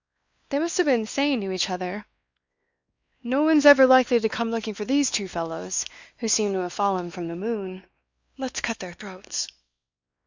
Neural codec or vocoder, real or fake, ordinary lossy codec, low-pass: codec, 16 kHz, 2 kbps, X-Codec, WavLM features, trained on Multilingual LibriSpeech; fake; Opus, 64 kbps; 7.2 kHz